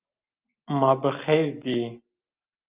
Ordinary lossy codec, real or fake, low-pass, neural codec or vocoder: Opus, 24 kbps; real; 3.6 kHz; none